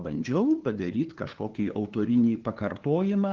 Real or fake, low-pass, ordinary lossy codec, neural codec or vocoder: fake; 7.2 kHz; Opus, 16 kbps; codec, 16 kHz, 2 kbps, FunCodec, trained on LibriTTS, 25 frames a second